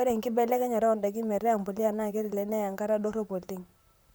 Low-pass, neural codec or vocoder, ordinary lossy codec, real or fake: none; vocoder, 44.1 kHz, 128 mel bands, Pupu-Vocoder; none; fake